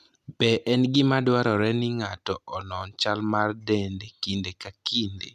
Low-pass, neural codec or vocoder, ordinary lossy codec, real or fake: 14.4 kHz; none; none; real